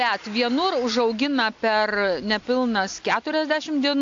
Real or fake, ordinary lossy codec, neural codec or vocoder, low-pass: real; AAC, 48 kbps; none; 7.2 kHz